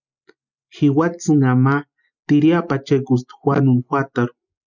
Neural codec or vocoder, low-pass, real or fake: none; 7.2 kHz; real